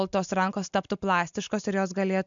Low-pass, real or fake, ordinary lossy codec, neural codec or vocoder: 7.2 kHz; real; MP3, 64 kbps; none